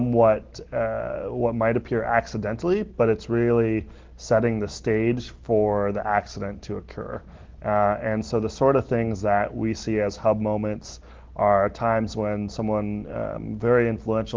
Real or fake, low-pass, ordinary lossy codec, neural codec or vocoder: real; 7.2 kHz; Opus, 16 kbps; none